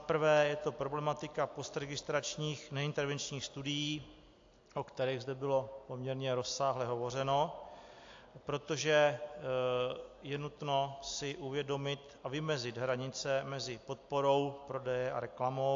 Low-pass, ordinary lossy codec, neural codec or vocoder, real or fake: 7.2 kHz; AAC, 48 kbps; none; real